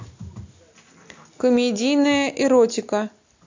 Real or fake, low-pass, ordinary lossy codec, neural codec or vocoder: real; 7.2 kHz; AAC, 48 kbps; none